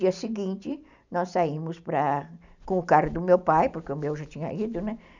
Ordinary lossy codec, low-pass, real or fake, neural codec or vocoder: none; 7.2 kHz; real; none